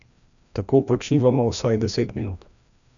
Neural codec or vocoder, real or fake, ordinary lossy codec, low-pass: codec, 16 kHz, 1 kbps, FreqCodec, larger model; fake; none; 7.2 kHz